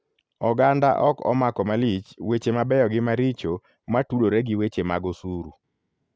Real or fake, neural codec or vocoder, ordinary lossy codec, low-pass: real; none; none; none